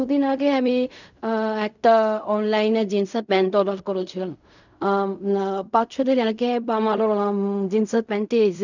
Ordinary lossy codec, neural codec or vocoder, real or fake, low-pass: none; codec, 16 kHz in and 24 kHz out, 0.4 kbps, LongCat-Audio-Codec, fine tuned four codebook decoder; fake; 7.2 kHz